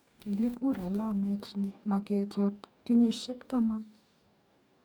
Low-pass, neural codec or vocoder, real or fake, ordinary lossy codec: none; codec, 44.1 kHz, 2.6 kbps, DAC; fake; none